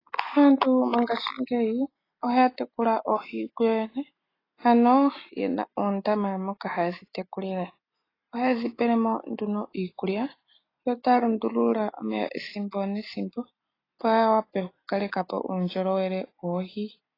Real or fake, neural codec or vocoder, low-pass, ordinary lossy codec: real; none; 5.4 kHz; AAC, 24 kbps